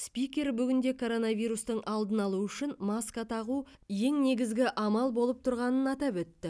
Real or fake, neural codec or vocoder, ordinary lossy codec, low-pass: real; none; none; none